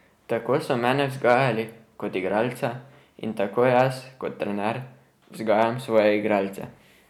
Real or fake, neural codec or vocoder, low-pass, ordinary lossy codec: real; none; 19.8 kHz; none